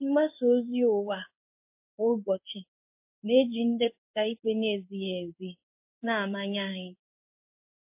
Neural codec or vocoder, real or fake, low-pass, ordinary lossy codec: codec, 16 kHz in and 24 kHz out, 1 kbps, XY-Tokenizer; fake; 3.6 kHz; MP3, 24 kbps